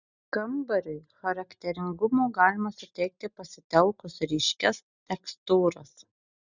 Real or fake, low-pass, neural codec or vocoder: real; 7.2 kHz; none